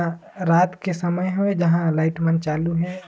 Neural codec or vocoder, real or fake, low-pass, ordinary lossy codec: none; real; none; none